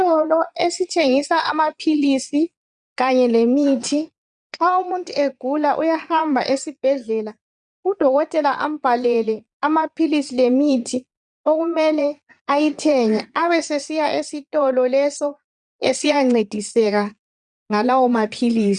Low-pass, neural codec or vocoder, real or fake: 9.9 kHz; vocoder, 22.05 kHz, 80 mel bands, Vocos; fake